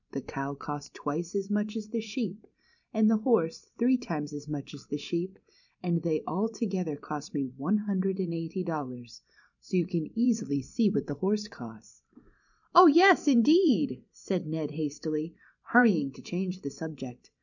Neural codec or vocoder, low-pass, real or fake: vocoder, 44.1 kHz, 128 mel bands every 512 samples, BigVGAN v2; 7.2 kHz; fake